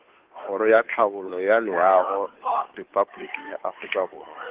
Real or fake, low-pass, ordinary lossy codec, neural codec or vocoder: fake; 3.6 kHz; Opus, 16 kbps; codec, 16 kHz, 2 kbps, FunCodec, trained on Chinese and English, 25 frames a second